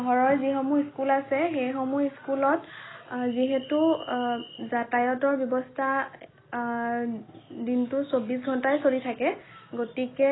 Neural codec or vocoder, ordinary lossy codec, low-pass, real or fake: none; AAC, 16 kbps; 7.2 kHz; real